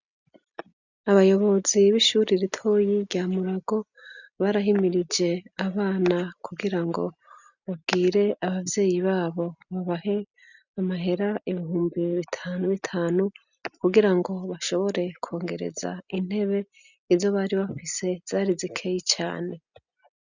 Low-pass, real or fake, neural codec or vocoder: 7.2 kHz; real; none